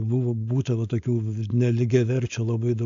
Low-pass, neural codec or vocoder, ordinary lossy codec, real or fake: 7.2 kHz; codec, 16 kHz, 4.8 kbps, FACodec; AAC, 64 kbps; fake